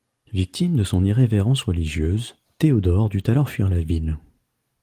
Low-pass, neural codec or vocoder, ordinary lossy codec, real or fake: 14.4 kHz; none; Opus, 24 kbps; real